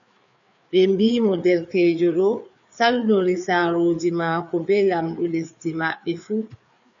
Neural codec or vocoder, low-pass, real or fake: codec, 16 kHz, 4 kbps, FreqCodec, larger model; 7.2 kHz; fake